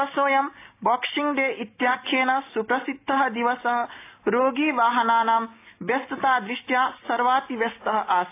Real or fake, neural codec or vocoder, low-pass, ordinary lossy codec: real; none; 3.6 kHz; AAC, 24 kbps